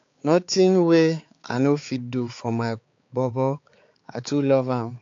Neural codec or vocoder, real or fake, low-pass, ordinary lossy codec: codec, 16 kHz, 4 kbps, X-Codec, HuBERT features, trained on balanced general audio; fake; 7.2 kHz; AAC, 48 kbps